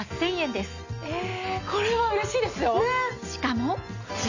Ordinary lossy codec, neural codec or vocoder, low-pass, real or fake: none; none; 7.2 kHz; real